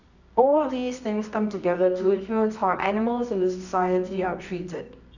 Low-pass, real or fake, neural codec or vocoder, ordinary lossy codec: 7.2 kHz; fake; codec, 24 kHz, 0.9 kbps, WavTokenizer, medium music audio release; none